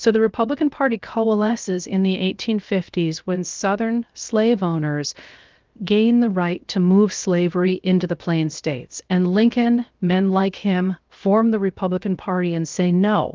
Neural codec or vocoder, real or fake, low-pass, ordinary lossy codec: codec, 16 kHz, 0.7 kbps, FocalCodec; fake; 7.2 kHz; Opus, 32 kbps